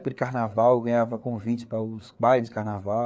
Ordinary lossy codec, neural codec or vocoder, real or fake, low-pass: none; codec, 16 kHz, 4 kbps, FreqCodec, larger model; fake; none